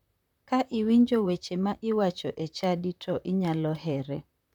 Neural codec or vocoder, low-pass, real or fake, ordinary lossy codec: vocoder, 44.1 kHz, 128 mel bands, Pupu-Vocoder; 19.8 kHz; fake; none